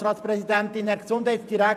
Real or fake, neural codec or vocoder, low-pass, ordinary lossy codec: fake; vocoder, 48 kHz, 128 mel bands, Vocos; 14.4 kHz; none